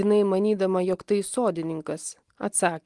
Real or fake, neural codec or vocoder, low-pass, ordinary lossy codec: real; none; 10.8 kHz; Opus, 24 kbps